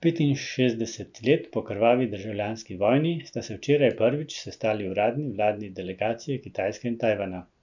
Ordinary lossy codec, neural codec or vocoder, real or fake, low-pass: none; none; real; 7.2 kHz